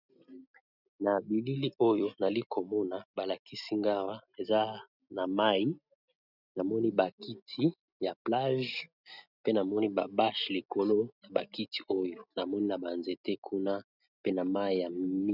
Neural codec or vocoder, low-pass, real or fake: none; 5.4 kHz; real